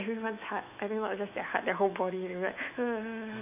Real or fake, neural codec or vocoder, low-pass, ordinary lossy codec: fake; autoencoder, 48 kHz, 128 numbers a frame, DAC-VAE, trained on Japanese speech; 3.6 kHz; none